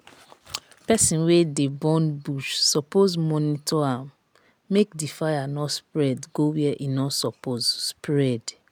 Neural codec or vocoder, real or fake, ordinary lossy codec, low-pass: none; real; none; none